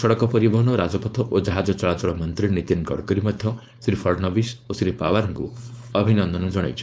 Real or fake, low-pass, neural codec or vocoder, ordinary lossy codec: fake; none; codec, 16 kHz, 4.8 kbps, FACodec; none